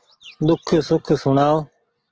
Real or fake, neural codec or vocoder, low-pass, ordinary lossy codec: real; none; 7.2 kHz; Opus, 16 kbps